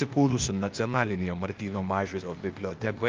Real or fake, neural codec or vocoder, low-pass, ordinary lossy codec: fake; codec, 16 kHz, 0.8 kbps, ZipCodec; 7.2 kHz; Opus, 24 kbps